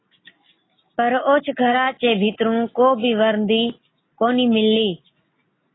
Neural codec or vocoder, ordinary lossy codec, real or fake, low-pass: none; AAC, 16 kbps; real; 7.2 kHz